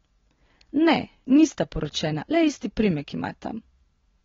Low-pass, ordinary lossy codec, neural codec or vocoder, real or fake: 7.2 kHz; AAC, 24 kbps; none; real